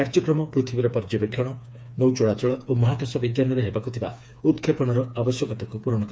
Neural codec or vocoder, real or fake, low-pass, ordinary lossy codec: codec, 16 kHz, 4 kbps, FreqCodec, smaller model; fake; none; none